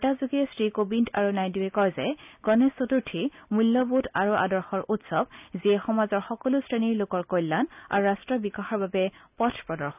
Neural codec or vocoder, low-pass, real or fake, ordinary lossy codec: none; 3.6 kHz; real; none